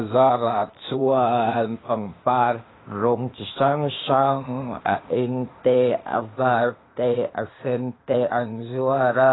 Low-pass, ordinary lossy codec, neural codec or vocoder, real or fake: 7.2 kHz; AAC, 16 kbps; codec, 16 kHz, 0.8 kbps, ZipCodec; fake